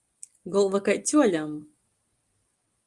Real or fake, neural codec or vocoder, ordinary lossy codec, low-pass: real; none; Opus, 32 kbps; 10.8 kHz